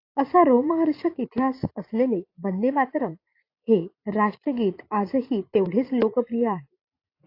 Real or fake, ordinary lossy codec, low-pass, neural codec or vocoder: fake; AAC, 32 kbps; 5.4 kHz; vocoder, 44.1 kHz, 80 mel bands, Vocos